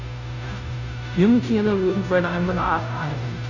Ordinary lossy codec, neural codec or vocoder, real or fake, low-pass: none; codec, 16 kHz, 0.5 kbps, FunCodec, trained on Chinese and English, 25 frames a second; fake; 7.2 kHz